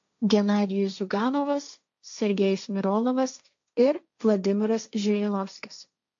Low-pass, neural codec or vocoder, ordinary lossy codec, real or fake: 7.2 kHz; codec, 16 kHz, 1.1 kbps, Voila-Tokenizer; AAC, 64 kbps; fake